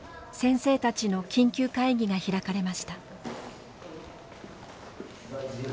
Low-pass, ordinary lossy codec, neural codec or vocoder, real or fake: none; none; none; real